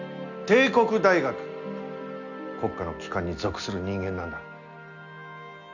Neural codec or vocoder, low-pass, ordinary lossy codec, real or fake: none; 7.2 kHz; none; real